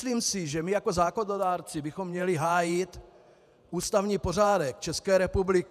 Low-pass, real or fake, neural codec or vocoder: 14.4 kHz; fake; vocoder, 48 kHz, 128 mel bands, Vocos